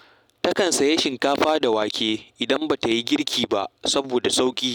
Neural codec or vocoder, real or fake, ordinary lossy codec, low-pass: none; real; none; 19.8 kHz